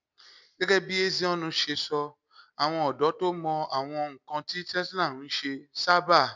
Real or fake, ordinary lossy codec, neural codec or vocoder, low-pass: real; none; none; 7.2 kHz